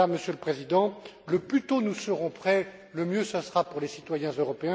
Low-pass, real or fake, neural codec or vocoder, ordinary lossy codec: none; real; none; none